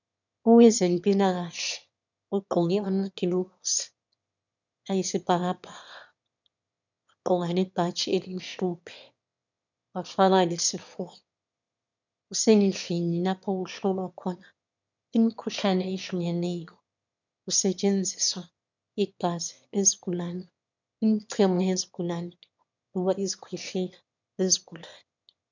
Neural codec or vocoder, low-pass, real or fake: autoencoder, 22.05 kHz, a latent of 192 numbers a frame, VITS, trained on one speaker; 7.2 kHz; fake